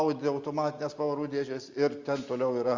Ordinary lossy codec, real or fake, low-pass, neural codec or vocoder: Opus, 32 kbps; real; 7.2 kHz; none